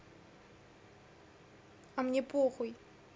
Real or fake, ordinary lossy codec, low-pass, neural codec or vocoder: real; none; none; none